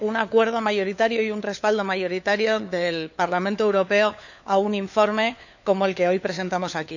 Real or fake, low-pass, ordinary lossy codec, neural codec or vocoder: fake; 7.2 kHz; none; codec, 16 kHz, 4 kbps, FunCodec, trained on LibriTTS, 50 frames a second